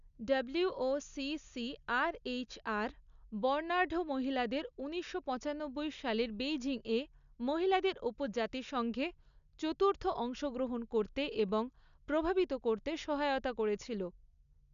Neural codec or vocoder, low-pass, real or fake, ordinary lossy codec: none; 7.2 kHz; real; none